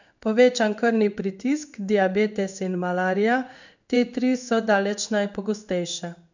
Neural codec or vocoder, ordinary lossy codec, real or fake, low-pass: codec, 16 kHz in and 24 kHz out, 1 kbps, XY-Tokenizer; none; fake; 7.2 kHz